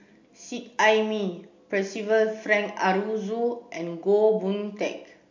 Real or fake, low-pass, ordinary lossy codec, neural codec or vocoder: real; 7.2 kHz; MP3, 64 kbps; none